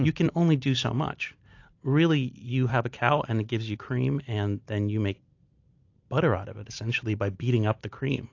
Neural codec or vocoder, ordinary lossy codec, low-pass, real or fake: none; AAC, 48 kbps; 7.2 kHz; real